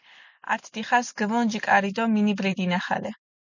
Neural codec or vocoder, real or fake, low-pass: none; real; 7.2 kHz